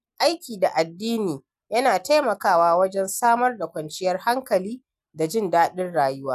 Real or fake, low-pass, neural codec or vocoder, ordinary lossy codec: real; 14.4 kHz; none; none